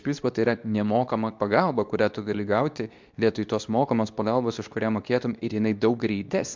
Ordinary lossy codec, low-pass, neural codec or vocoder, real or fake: MP3, 64 kbps; 7.2 kHz; codec, 24 kHz, 0.9 kbps, WavTokenizer, medium speech release version 2; fake